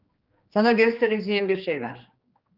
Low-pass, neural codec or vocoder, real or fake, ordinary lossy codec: 5.4 kHz; codec, 16 kHz, 2 kbps, X-Codec, HuBERT features, trained on general audio; fake; Opus, 32 kbps